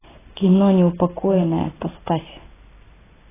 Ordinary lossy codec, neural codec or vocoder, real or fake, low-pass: AAC, 16 kbps; none; real; 3.6 kHz